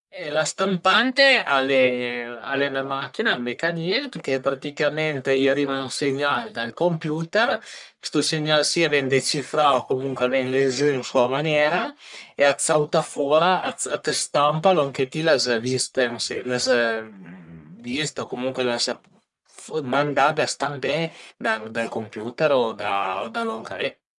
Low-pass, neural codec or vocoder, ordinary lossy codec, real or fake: 10.8 kHz; codec, 44.1 kHz, 1.7 kbps, Pupu-Codec; none; fake